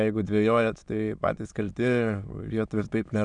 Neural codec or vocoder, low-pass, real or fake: autoencoder, 22.05 kHz, a latent of 192 numbers a frame, VITS, trained on many speakers; 9.9 kHz; fake